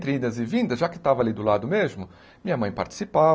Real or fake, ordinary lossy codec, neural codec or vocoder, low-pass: real; none; none; none